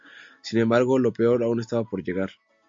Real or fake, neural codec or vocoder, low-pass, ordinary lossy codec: real; none; 7.2 kHz; MP3, 48 kbps